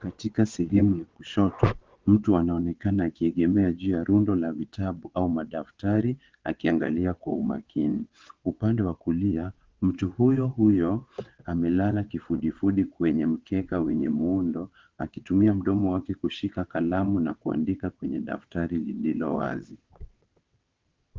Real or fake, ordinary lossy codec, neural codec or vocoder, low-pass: fake; Opus, 16 kbps; vocoder, 22.05 kHz, 80 mel bands, WaveNeXt; 7.2 kHz